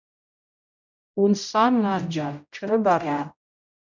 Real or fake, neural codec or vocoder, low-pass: fake; codec, 16 kHz, 0.5 kbps, X-Codec, HuBERT features, trained on general audio; 7.2 kHz